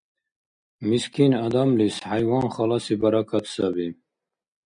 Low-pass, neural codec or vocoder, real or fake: 9.9 kHz; none; real